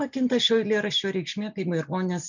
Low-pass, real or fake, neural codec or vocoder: 7.2 kHz; real; none